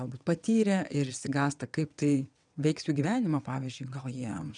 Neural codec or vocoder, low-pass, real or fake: vocoder, 22.05 kHz, 80 mel bands, WaveNeXt; 9.9 kHz; fake